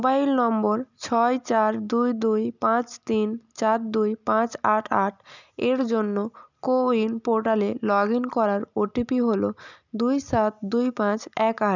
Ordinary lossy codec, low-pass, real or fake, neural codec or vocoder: none; 7.2 kHz; real; none